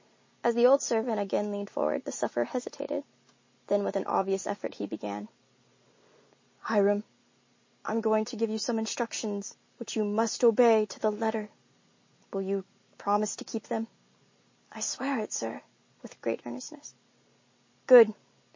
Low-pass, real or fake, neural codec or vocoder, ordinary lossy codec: 7.2 kHz; real; none; MP3, 32 kbps